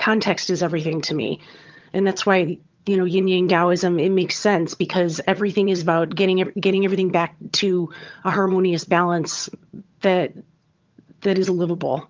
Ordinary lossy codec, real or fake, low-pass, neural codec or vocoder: Opus, 24 kbps; fake; 7.2 kHz; vocoder, 22.05 kHz, 80 mel bands, HiFi-GAN